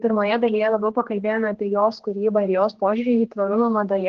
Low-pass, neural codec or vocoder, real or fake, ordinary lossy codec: 7.2 kHz; codec, 16 kHz, 2 kbps, X-Codec, HuBERT features, trained on general audio; fake; Opus, 16 kbps